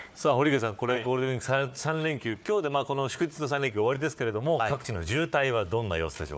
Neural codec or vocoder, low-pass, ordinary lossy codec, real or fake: codec, 16 kHz, 4 kbps, FunCodec, trained on Chinese and English, 50 frames a second; none; none; fake